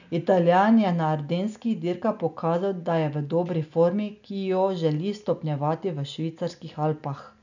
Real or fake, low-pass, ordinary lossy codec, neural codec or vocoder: real; 7.2 kHz; none; none